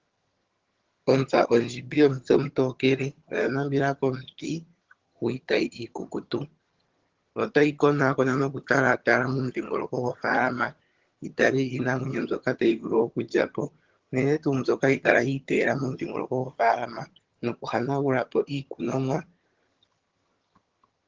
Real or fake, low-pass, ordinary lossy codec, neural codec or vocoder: fake; 7.2 kHz; Opus, 16 kbps; vocoder, 22.05 kHz, 80 mel bands, HiFi-GAN